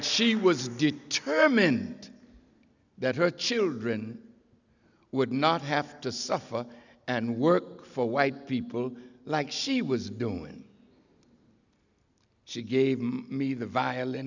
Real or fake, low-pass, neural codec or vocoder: real; 7.2 kHz; none